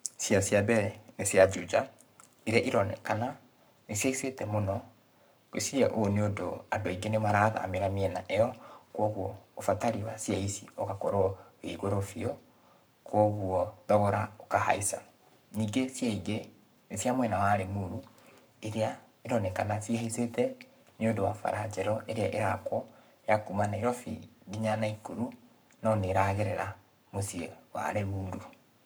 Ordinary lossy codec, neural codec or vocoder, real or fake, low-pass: none; codec, 44.1 kHz, 7.8 kbps, Pupu-Codec; fake; none